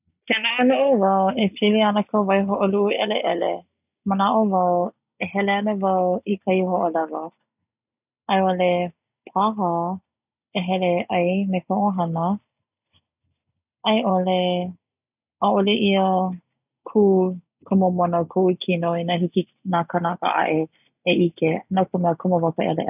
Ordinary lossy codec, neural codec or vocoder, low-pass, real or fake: none; none; 3.6 kHz; real